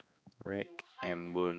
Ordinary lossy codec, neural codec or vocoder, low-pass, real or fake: none; codec, 16 kHz, 2 kbps, X-Codec, HuBERT features, trained on balanced general audio; none; fake